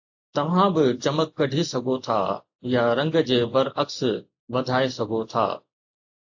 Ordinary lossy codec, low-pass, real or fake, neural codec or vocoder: AAC, 48 kbps; 7.2 kHz; real; none